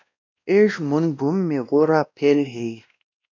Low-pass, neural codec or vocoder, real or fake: 7.2 kHz; codec, 16 kHz, 1 kbps, X-Codec, WavLM features, trained on Multilingual LibriSpeech; fake